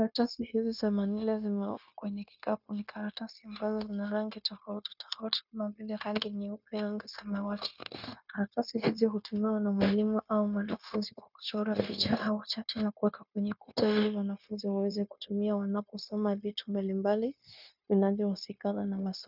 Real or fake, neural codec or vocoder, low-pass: fake; codec, 16 kHz, 0.9 kbps, LongCat-Audio-Codec; 5.4 kHz